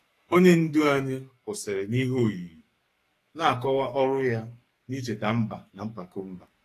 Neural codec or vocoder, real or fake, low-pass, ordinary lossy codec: codec, 44.1 kHz, 2.6 kbps, SNAC; fake; 14.4 kHz; AAC, 48 kbps